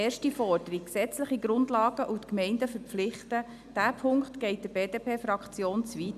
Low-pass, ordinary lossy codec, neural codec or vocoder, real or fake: 14.4 kHz; none; none; real